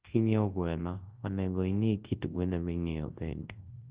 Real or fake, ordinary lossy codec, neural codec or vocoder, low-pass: fake; Opus, 16 kbps; codec, 24 kHz, 0.9 kbps, WavTokenizer, large speech release; 3.6 kHz